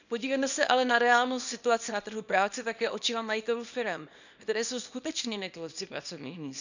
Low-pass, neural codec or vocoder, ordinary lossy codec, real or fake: 7.2 kHz; codec, 24 kHz, 0.9 kbps, WavTokenizer, small release; none; fake